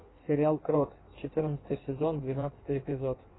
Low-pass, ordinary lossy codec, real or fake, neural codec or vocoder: 7.2 kHz; AAC, 16 kbps; fake; codec, 16 kHz in and 24 kHz out, 1.1 kbps, FireRedTTS-2 codec